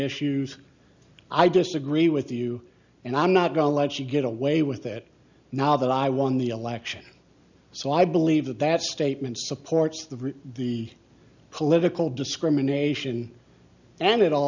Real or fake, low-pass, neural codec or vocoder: real; 7.2 kHz; none